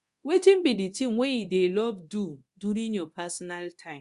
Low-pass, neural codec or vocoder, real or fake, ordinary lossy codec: 10.8 kHz; codec, 24 kHz, 0.9 kbps, DualCodec; fake; Opus, 64 kbps